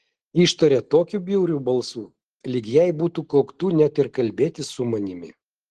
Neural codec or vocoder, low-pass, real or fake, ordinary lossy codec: none; 10.8 kHz; real; Opus, 16 kbps